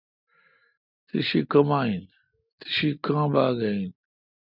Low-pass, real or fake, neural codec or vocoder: 5.4 kHz; real; none